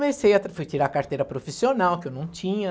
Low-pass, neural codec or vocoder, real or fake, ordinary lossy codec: none; none; real; none